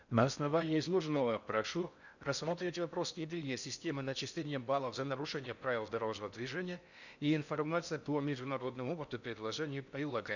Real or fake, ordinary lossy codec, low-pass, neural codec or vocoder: fake; none; 7.2 kHz; codec, 16 kHz in and 24 kHz out, 0.6 kbps, FocalCodec, streaming, 4096 codes